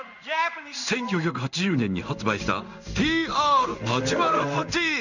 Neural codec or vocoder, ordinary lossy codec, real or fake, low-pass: codec, 16 kHz in and 24 kHz out, 1 kbps, XY-Tokenizer; none; fake; 7.2 kHz